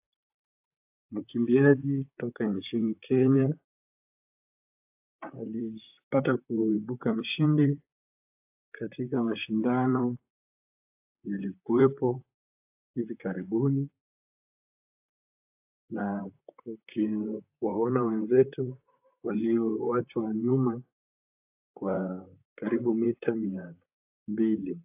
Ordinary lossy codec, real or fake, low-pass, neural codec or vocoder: AAC, 32 kbps; fake; 3.6 kHz; vocoder, 44.1 kHz, 128 mel bands, Pupu-Vocoder